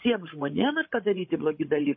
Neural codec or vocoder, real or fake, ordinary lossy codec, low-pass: codec, 16 kHz, 16 kbps, FreqCodec, larger model; fake; MP3, 24 kbps; 7.2 kHz